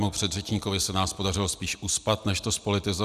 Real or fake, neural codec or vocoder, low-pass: real; none; 14.4 kHz